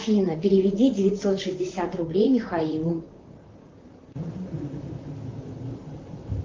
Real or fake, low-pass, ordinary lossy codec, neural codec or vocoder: fake; 7.2 kHz; Opus, 32 kbps; vocoder, 44.1 kHz, 128 mel bands, Pupu-Vocoder